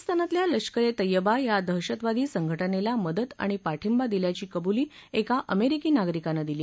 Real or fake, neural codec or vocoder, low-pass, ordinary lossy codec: real; none; none; none